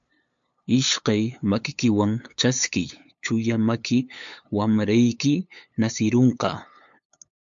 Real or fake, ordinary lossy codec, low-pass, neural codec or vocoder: fake; MP3, 64 kbps; 7.2 kHz; codec, 16 kHz, 8 kbps, FunCodec, trained on LibriTTS, 25 frames a second